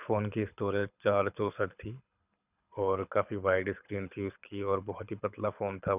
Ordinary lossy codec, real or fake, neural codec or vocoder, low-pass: none; fake; codec, 24 kHz, 6 kbps, HILCodec; 3.6 kHz